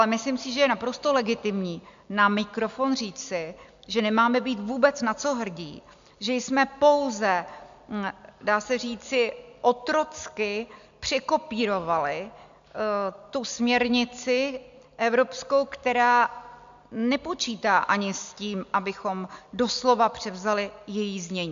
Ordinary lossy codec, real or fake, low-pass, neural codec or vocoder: MP3, 64 kbps; real; 7.2 kHz; none